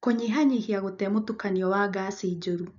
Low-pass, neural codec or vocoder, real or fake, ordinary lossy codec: 7.2 kHz; none; real; none